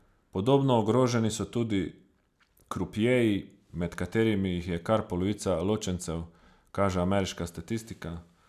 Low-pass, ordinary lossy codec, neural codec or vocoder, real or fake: 14.4 kHz; none; none; real